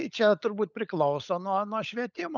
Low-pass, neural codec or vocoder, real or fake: 7.2 kHz; codec, 16 kHz, 16 kbps, FunCodec, trained on LibriTTS, 50 frames a second; fake